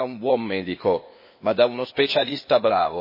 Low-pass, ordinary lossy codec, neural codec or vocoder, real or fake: 5.4 kHz; MP3, 24 kbps; codec, 16 kHz, 0.8 kbps, ZipCodec; fake